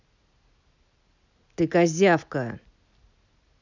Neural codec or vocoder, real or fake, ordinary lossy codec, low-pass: none; real; none; 7.2 kHz